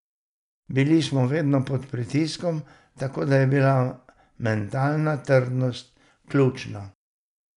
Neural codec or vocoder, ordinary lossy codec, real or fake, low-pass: none; none; real; 10.8 kHz